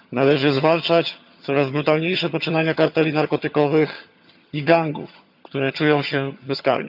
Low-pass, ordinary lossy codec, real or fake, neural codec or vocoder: 5.4 kHz; none; fake; vocoder, 22.05 kHz, 80 mel bands, HiFi-GAN